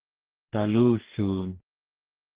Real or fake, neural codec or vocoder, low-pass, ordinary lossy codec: fake; codec, 44.1 kHz, 2.6 kbps, DAC; 3.6 kHz; Opus, 16 kbps